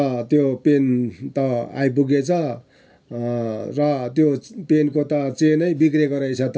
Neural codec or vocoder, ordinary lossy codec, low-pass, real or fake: none; none; none; real